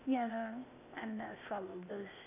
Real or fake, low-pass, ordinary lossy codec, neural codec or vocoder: fake; 3.6 kHz; none; codec, 16 kHz, 0.8 kbps, ZipCodec